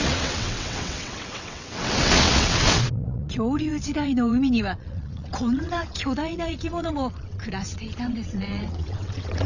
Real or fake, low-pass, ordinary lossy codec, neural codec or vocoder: fake; 7.2 kHz; none; vocoder, 22.05 kHz, 80 mel bands, WaveNeXt